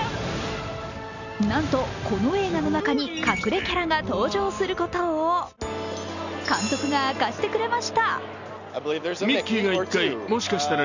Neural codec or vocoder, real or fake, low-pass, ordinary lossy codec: none; real; 7.2 kHz; none